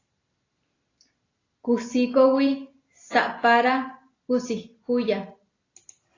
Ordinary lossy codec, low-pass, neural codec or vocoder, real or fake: AAC, 32 kbps; 7.2 kHz; none; real